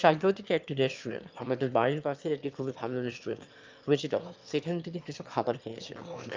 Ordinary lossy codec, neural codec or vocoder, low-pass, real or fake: Opus, 24 kbps; autoencoder, 22.05 kHz, a latent of 192 numbers a frame, VITS, trained on one speaker; 7.2 kHz; fake